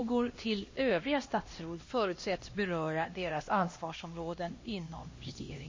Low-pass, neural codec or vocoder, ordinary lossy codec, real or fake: 7.2 kHz; codec, 16 kHz, 1 kbps, X-Codec, HuBERT features, trained on LibriSpeech; MP3, 32 kbps; fake